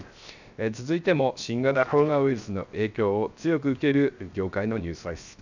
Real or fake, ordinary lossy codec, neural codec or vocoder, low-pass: fake; none; codec, 16 kHz, 0.7 kbps, FocalCodec; 7.2 kHz